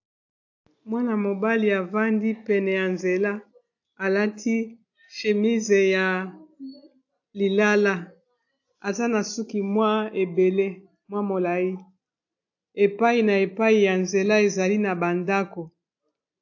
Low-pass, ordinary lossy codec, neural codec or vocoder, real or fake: 7.2 kHz; AAC, 48 kbps; none; real